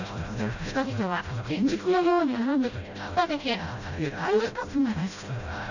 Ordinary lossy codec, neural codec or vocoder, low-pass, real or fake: none; codec, 16 kHz, 0.5 kbps, FreqCodec, smaller model; 7.2 kHz; fake